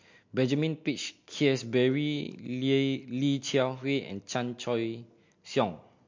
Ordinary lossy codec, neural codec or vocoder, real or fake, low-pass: MP3, 48 kbps; none; real; 7.2 kHz